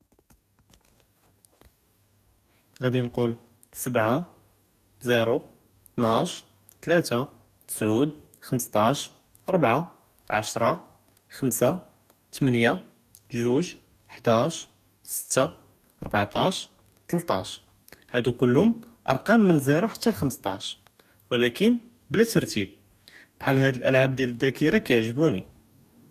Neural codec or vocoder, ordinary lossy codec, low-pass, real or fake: codec, 44.1 kHz, 2.6 kbps, DAC; none; 14.4 kHz; fake